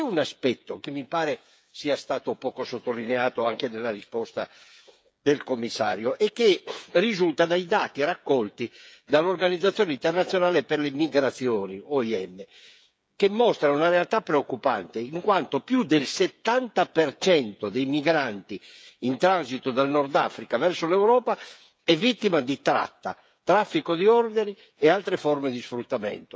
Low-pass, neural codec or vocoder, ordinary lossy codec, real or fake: none; codec, 16 kHz, 8 kbps, FreqCodec, smaller model; none; fake